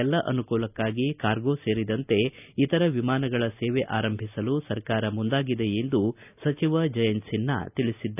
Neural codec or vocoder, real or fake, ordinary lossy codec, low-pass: none; real; none; 3.6 kHz